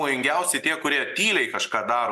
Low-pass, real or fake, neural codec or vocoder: 14.4 kHz; real; none